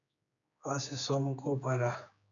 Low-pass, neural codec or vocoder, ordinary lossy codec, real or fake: 7.2 kHz; codec, 16 kHz, 4 kbps, X-Codec, HuBERT features, trained on general audio; MP3, 96 kbps; fake